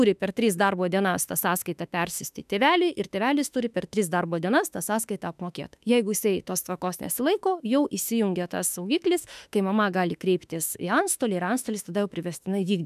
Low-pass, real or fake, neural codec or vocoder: 14.4 kHz; fake; autoencoder, 48 kHz, 32 numbers a frame, DAC-VAE, trained on Japanese speech